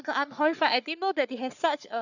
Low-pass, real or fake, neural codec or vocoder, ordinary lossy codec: 7.2 kHz; fake; codec, 44.1 kHz, 3.4 kbps, Pupu-Codec; none